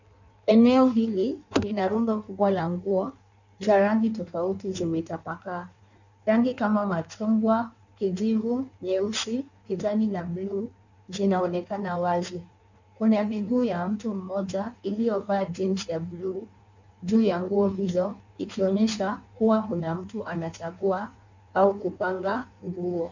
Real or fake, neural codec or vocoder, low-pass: fake; codec, 16 kHz in and 24 kHz out, 1.1 kbps, FireRedTTS-2 codec; 7.2 kHz